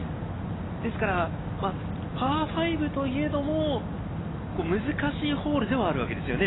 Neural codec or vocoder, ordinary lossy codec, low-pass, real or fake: none; AAC, 16 kbps; 7.2 kHz; real